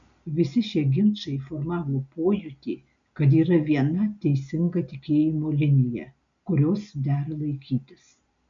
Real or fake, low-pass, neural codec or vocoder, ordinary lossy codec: real; 7.2 kHz; none; MP3, 64 kbps